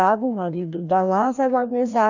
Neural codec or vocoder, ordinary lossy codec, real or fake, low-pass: codec, 16 kHz, 1 kbps, FreqCodec, larger model; none; fake; 7.2 kHz